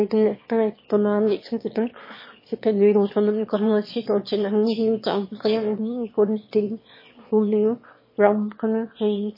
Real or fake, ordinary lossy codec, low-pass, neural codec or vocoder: fake; MP3, 24 kbps; 5.4 kHz; autoencoder, 22.05 kHz, a latent of 192 numbers a frame, VITS, trained on one speaker